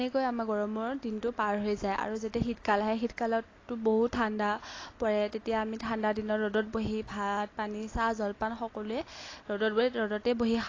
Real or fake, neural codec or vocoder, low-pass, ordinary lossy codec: real; none; 7.2 kHz; AAC, 32 kbps